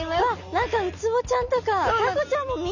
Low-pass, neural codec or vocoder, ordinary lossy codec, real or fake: 7.2 kHz; none; none; real